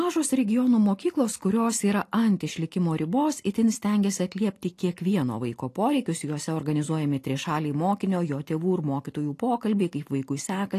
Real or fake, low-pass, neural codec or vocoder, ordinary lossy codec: real; 14.4 kHz; none; AAC, 48 kbps